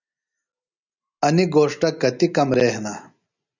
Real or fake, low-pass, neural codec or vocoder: real; 7.2 kHz; none